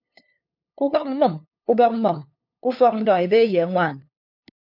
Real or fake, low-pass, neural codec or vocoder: fake; 5.4 kHz; codec, 16 kHz, 2 kbps, FunCodec, trained on LibriTTS, 25 frames a second